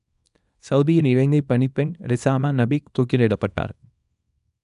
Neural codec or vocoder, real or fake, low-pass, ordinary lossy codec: codec, 24 kHz, 0.9 kbps, WavTokenizer, small release; fake; 10.8 kHz; none